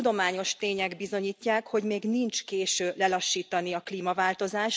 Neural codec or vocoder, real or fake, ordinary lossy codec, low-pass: none; real; none; none